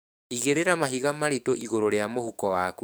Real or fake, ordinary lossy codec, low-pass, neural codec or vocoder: fake; none; none; codec, 44.1 kHz, 7.8 kbps, DAC